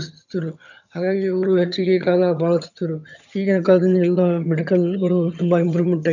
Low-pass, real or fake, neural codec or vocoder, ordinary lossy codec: 7.2 kHz; fake; vocoder, 22.05 kHz, 80 mel bands, HiFi-GAN; none